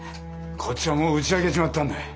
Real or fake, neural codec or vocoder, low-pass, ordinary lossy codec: real; none; none; none